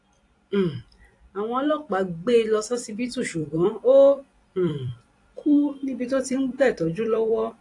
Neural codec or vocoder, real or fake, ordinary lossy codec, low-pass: vocoder, 44.1 kHz, 128 mel bands every 256 samples, BigVGAN v2; fake; AAC, 48 kbps; 10.8 kHz